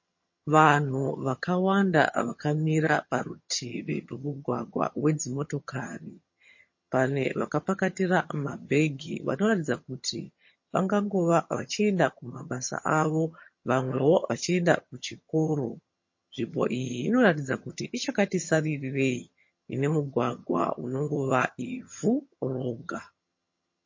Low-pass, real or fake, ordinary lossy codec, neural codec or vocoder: 7.2 kHz; fake; MP3, 32 kbps; vocoder, 22.05 kHz, 80 mel bands, HiFi-GAN